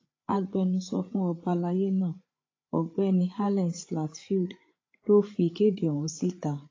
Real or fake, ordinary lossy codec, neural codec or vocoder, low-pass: fake; AAC, 32 kbps; codec, 16 kHz, 8 kbps, FreqCodec, larger model; 7.2 kHz